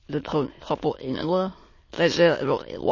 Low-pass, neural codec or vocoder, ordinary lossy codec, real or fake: 7.2 kHz; autoencoder, 22.05 kHz, a latent of 192 numbers a frame, VITS, trained on many speakers; MP3, 32 kbps; fake